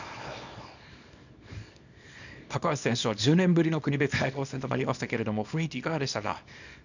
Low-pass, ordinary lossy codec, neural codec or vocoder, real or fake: 7.2 kHz; none; codec, 24 kHz, 0.9 kbps, WavTokenizer, small release; fake